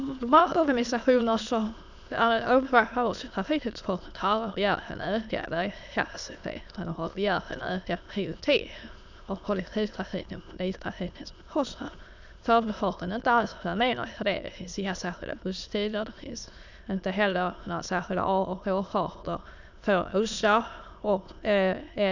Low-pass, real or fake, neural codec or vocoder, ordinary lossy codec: 7.2 kHz; fake; autoencoder, 22.05 kHz, a latent of 192 numbers a frame, VITS, trained on many speakers; none